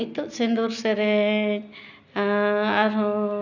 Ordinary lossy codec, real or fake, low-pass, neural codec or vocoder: none; real; 7.2 kHz; none